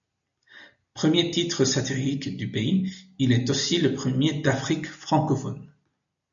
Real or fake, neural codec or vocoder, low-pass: real; none; 7.2 kHz